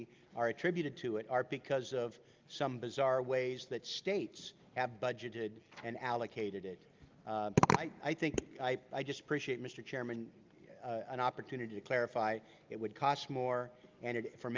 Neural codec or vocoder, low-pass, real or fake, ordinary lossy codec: none; 7.2 kHz; real; Opus, 32 kbps